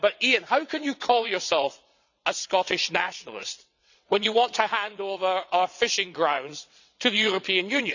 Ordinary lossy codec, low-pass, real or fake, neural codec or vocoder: none; 7.2 kHz; fake; vocoder, 22.05 kHz, 80 mel bands, WaveNeXt